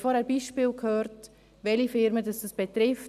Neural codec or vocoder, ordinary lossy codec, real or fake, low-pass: none; none; real; 14.4 kHz